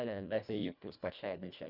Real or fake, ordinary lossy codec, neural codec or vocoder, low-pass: fake; none; codec, 24 kHz, 1.5 kbps, HILCodec; 5.4 kHz